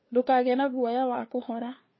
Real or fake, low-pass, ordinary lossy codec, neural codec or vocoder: fake; 7.2 kHz; MP3, 24 kbps; codec, 16 kHz, 2 kbps, FunCodec, trained on LibriTTS, 25 frames a second